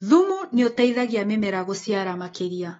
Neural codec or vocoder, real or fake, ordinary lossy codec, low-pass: autoencoder, 48 kHz, 128 numbers a frame, DAC-VAE, trained on Japanese speech; fake; AAC, 24 kbps; 19.8 kHz